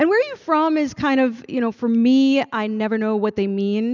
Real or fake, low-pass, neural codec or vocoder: real; 7.2 kHz; none